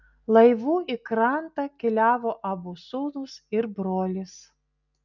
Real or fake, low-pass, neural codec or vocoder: real; 7.2 kHz; none